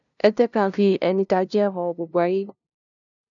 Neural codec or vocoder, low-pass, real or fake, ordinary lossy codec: codec, 16 kHz, 0.5 kbps, FunCodec, trained on LibriTTS, 25 frames a second; 7.2 kHz; fake; none